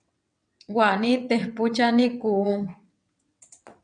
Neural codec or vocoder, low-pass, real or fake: vocoder, 22.05 kHz, 80 mel bands, WaveNeXt; 9.9 kHz; fake